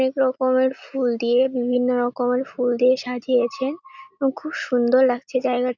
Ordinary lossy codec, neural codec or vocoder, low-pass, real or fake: none; none; 7.2 kHz; real